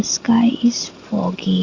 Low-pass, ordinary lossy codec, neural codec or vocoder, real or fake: 7.2 kHz; Opus, 64 kbps; none; real